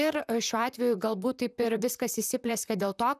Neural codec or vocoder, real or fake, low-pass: vocoder, 44.1 kHz, 128 mel bands, Pupu-Vocoder; fake; 14.4 kHz